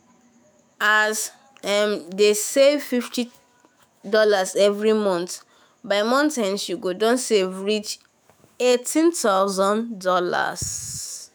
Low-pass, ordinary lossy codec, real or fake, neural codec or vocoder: none; none; fake; autoencoder, 48 kHz, 128 numbers a frame, DAC-VAE, trained on Japanese speech